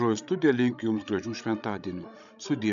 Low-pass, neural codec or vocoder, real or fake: 7.2 kHz; codec, 16 kHz, 16 kbps, FreqCodec, larger model; fake